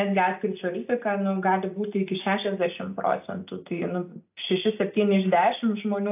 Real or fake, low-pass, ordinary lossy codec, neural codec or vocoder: real; 3.6 kHz; AAC, 32 kbps; none